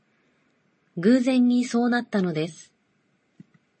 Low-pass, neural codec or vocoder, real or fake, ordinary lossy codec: 9.9 kHz; none; real; MP3, 32 kbps